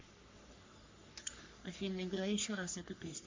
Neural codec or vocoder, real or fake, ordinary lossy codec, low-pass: codec, 44.1 kHz, 3.4 kbps, Pupu-Codec; fake; MP3, 48 kbps; 7.2 kHz